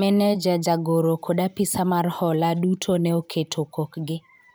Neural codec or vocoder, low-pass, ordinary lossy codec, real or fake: vocoder, 44.1 kHz, 128 mel bands every 512 samples, BigVGAN v2; none; none; fake